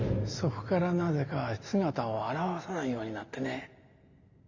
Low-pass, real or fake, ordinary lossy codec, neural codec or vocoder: 7.2 kHz; real; Opus, 64 kbps; none